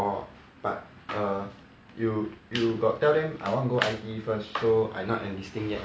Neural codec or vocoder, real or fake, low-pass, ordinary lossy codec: none; real; none; none